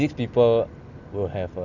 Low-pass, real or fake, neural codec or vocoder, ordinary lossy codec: 7.2 kHz; real; none; none